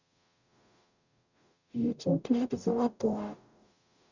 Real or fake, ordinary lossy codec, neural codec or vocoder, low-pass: fake; none; codec, 44.1 kHz, 0.9 kbps, DAC; 7.2 kHz